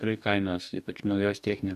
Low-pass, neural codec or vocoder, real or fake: 14.4 kHz; codec, 44.1 kHz, 2.6 kbps, DAC; fake